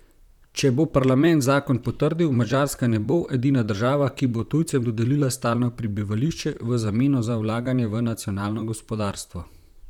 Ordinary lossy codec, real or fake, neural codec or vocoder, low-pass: none; fake; vocoder, 44.1 kHz, 128 mel bands, Pupu-Vocoder; 19.8 kHz